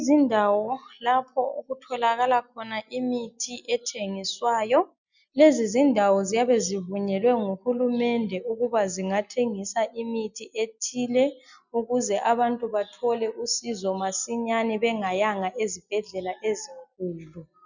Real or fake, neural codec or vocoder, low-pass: real; none; 7.2 kHz